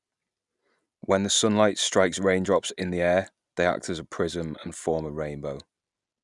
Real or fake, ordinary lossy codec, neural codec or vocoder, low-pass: real; none; none; 10.8 kHz